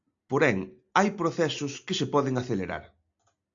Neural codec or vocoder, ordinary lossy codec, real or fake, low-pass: none; AAC, 64 kbps; real; 7.2 kHz